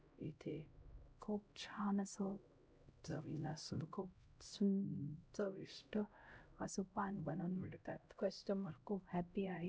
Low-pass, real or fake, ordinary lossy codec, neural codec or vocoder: none; fake; none; codec, 16 kHz, 0.5 kbps, X-Codec, HuBERT features, trained on LibriSpeech